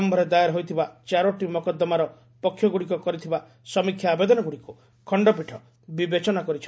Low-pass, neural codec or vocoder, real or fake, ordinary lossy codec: none; none; real; none